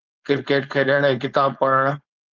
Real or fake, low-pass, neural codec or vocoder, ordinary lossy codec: fake; 7.2 kHz; codec, 16 kHz, 4.8 kbps, FACodec; Opus, 24 kbps